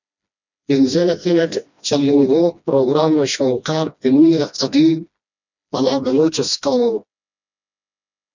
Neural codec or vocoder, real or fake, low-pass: codec, 16 kHz, 1 kbps, FreqCodec, smaller model; fake; 7.2 kHz